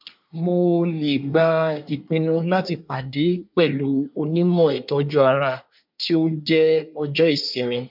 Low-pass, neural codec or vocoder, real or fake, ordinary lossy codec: 5.4 kHz; codec, 24 kHz, 1 kbps, SNAC; fake; MP3, 48 kbps